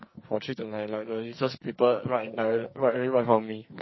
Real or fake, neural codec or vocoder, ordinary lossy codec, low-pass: fake; codec, 44.1 kHz, 2.6 kbps, SNAC; MP3, 24 kbps; 7.2 kHz